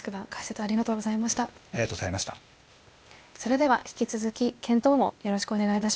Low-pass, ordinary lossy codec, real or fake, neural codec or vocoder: none; none; fake; codec, 16 kHz, 0.8 kbps, ZipCodec